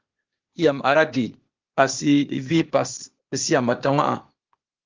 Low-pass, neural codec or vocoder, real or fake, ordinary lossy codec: 7.2 kHz; codec, 16 kHz, 0.8 kbps, ZipCodec; fake; Opus, 32 kbps